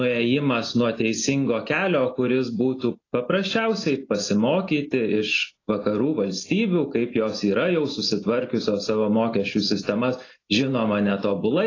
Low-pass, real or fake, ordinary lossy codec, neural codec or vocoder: 7.2 kHz; real; AAC, 32 kbps; none